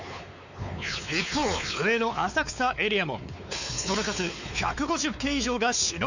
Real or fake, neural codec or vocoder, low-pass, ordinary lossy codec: fake; codec, 16 kHz, 4 kbps, X-Codec, WavLM features, trained on Multilingual LibriSpeech; 7.2 kHz; none